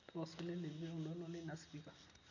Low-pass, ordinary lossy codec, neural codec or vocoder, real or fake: 7.2 kHz; none; none; real